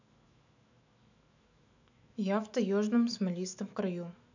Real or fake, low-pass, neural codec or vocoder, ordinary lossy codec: fake; 7.2 kHz; autoencoder, 48 kHz, 128 numbers a frame, DAC-VAE, trained on Japanese speech; none